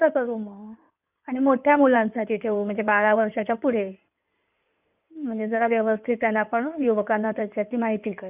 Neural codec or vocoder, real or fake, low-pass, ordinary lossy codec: codec, 16 kHz in and 24 kHz out, 2.2 kbps, FireRedTTS-2 codec; fake; 3.6 kHz; none